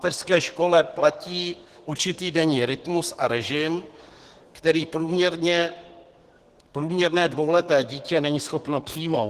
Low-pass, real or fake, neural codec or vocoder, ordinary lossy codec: 14.4 kHz; fake; codec, 32 kHz, 1.9 kbps, SNAC; Opus, 16 kbps